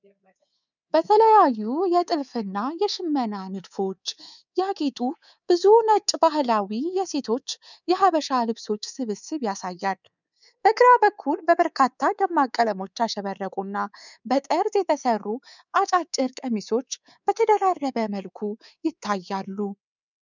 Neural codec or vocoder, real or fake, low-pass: codec, 24 kHz, 3.1 kbps, DualCodec; fake; 7.2 kHz